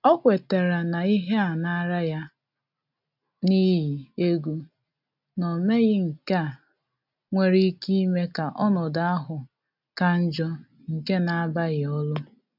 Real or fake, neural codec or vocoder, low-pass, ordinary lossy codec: real; none; 5.4 kHz; none